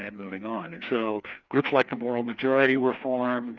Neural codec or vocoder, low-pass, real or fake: codec, 16 kHz, 2 kbps, FreqCodec, larger model; 7.2 kHz; fake